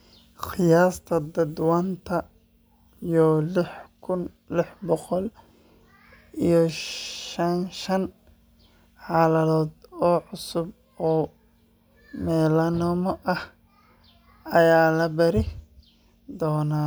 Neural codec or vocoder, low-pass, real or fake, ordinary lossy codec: none; none; real; none